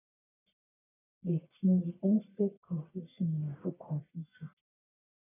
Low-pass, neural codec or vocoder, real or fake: 3.6 kHz; codec, 44.1 kHz, 1.7 kbps, Pupu-Codec; fake